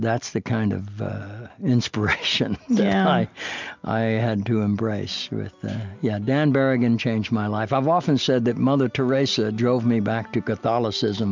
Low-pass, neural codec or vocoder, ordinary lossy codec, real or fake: 7.2 kHz; none; MP3, 64 kbps; real